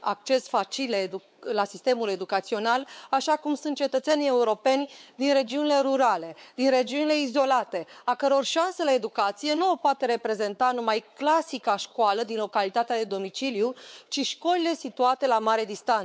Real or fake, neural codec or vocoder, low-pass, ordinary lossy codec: fake; codec, 16 kHz, 4 kbps, X-Codec, WavLM features, trained on Multilingual LibriSpeech; none; none